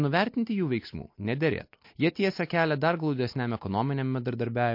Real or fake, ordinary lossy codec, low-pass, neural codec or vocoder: real; MP3, 32 kbps; 5.4 kHz; none